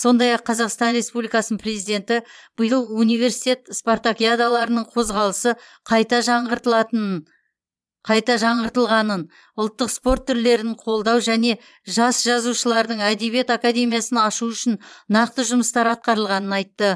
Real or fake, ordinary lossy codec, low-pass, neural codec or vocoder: fake; none; none; vocoder, 22.05 kHz, 80 mel bands, Vocos